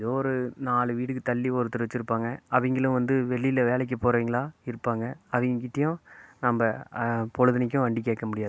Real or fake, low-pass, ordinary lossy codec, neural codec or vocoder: real; none; none; none